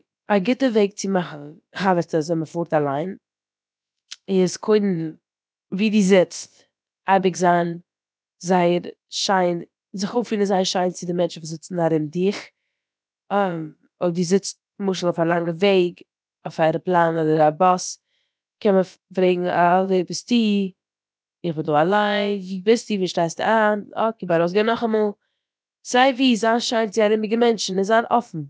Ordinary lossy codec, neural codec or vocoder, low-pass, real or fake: none; codec, 16 kHz, about 1 kbps, DyCAST, with the encoder's durations; none; fake